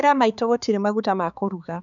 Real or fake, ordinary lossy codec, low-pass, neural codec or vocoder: fake; none; 7.2 kHz; codec, 16 kHz, 4 kbps, X-Codec, HuBERT features, trained on balanced general audio